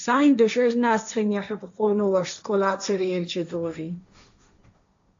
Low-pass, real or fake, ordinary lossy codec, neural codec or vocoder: 7.2 kHz; fake; AAC, 64 kbps; codec, 16 kHz, 1.1 kbps, Voila-Tokenizer